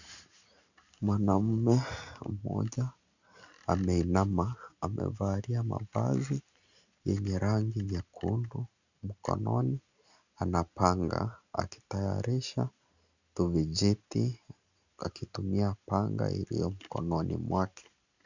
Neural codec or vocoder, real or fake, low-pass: none; real; 7.2 kHz